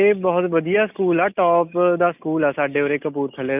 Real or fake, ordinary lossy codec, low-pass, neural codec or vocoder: real; none; 3.6 kHz; none